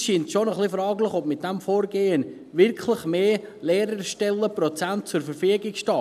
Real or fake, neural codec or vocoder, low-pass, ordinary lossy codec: real; none; 14.4 kHz; none